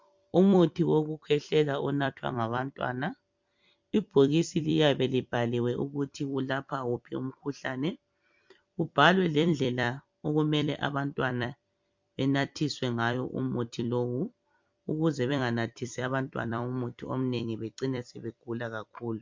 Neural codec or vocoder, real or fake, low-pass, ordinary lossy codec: vocoder, 44.1 kHz, 128 mel bands every 256 samples, BigVGAN v2; fake; 7.2 kHz; MP3, 64 kbps